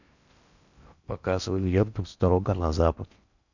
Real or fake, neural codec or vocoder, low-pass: fake; codec, 16 kHz in and 24 kHz out, 0.6 kbps, FocalCodec, streaming, 4096 codes; 7.2 kHz